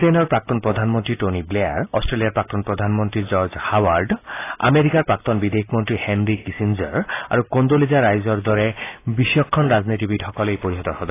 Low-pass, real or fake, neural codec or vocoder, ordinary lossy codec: 3.6 kHz; real; none; AAC, 24 kbps